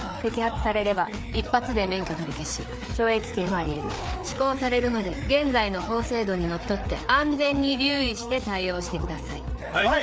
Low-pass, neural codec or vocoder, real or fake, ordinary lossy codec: none; codec, 16 kHz, 4 kbps, FreqCodec, larger model; fake; none